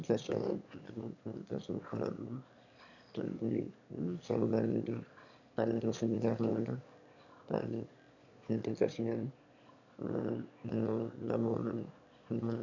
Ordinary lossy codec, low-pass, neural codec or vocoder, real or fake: none; 7.2 kHz; autoencoder, 22.05 kHz, a latent of 192 numbers a frame, VITS, trained on one speaker; fake